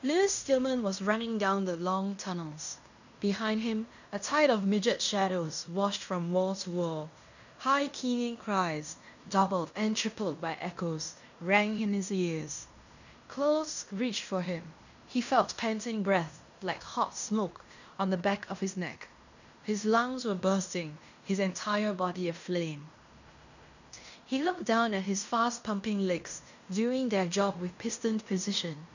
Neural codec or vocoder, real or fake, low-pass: codec, 16 kHz in and 24 kHz out, 0.9 kbps, LongCat-Audio-Codec, fine tuned four codebook decoder; fake; 7.2 kHz